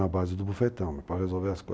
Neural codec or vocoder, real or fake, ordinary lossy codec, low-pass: none; real; none; none